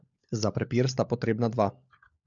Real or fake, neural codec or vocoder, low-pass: fake; codec, 16 kHz, 16 kbps, FunCodec, trained on LibriTTS, 50 frames a second; 7.2 kHz